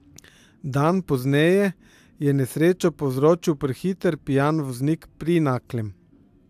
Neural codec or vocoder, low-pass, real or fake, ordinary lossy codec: none; 14.4 kHz; real; AAC, 96 kbps